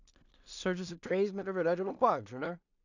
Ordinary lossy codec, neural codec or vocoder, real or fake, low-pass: none; codec, 16 kHz in and 24 kHz out, 0.4 kbps, LongCat-Audio-Codec, two codebook decoder; fake; 7.2 kHz